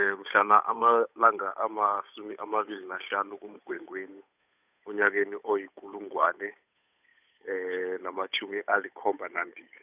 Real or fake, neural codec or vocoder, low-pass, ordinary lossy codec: fake; codec, 16 kHz, 8 kbps, FunCodec, trained on Chinese and English, 25 frames a second; 3.6 kHz; none